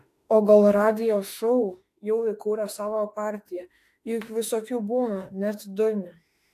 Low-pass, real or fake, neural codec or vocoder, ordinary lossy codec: 14.4 kHz; fake; autoencoder, 48 kHz, 32 numbers a frame, DAC-VAE, trained on Japanese speech; AAC, 64 kbps